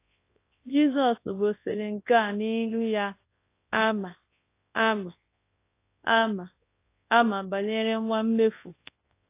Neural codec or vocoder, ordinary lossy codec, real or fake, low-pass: codec, 24 kHz, 0.9 kbps, WavTokenizer, large speech release; AAC, 24 kbps; fake; 3.6 kHz